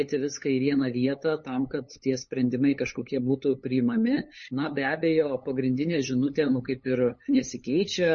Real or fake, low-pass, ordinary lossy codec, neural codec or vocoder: fake; 7.2 kHz; MP3, 32 kbps; codec, 16 kHz, 8 kbps, FunCodec, trained on LibriTTS, 25 frames a second